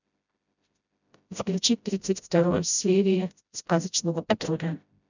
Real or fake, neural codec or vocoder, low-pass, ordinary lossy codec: fake; codec, 16 kHz, 0.5 kbps, FreqCodec, smaller model; 7.2 kHz; none